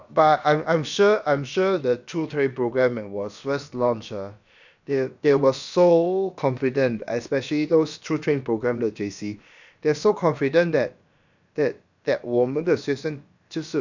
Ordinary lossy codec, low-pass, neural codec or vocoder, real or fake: none; 7.2 kHz; codec, 16 kHz, about 1 kbps, DyCAST, with the encoder's durations; fake